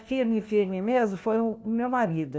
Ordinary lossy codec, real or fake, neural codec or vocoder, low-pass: none; fake; codec, 16 kHz, 1 kbps, FunCodec, trained on LibriTTS, 50 frames a second; none